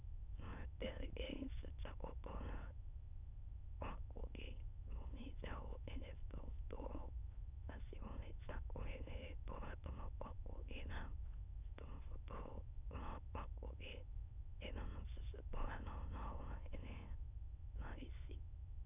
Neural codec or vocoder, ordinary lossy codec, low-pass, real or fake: autoencoder, 22.05 kHz, a latent of 192 numbers a frame, VITS, trained on many speakers; none; 3.6 kHz; fake